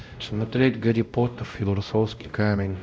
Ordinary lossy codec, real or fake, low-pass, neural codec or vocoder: none; fake; none; codec, 16 kHz, 0.5 kbps, X-Codec, WavLM features, trained on Multilingual LibriSpeech